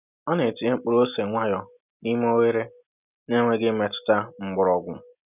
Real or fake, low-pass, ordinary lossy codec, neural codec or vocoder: real; 3.6 kHz; none; none